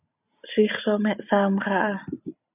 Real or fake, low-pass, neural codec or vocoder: real; 3.6 kHz; none